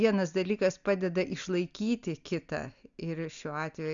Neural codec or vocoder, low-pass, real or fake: none; 7.2 kHz; real